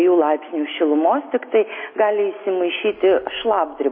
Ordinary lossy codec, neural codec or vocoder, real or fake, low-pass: MP3, 24 kbps; none; real; 5.4 kHz